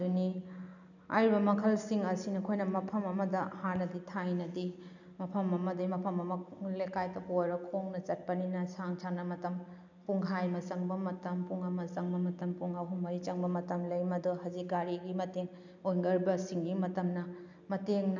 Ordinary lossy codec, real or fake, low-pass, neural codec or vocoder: none; real; 7.2 kHz; none